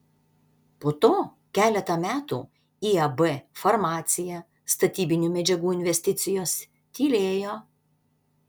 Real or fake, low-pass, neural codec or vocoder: real; 19.8 kHz; none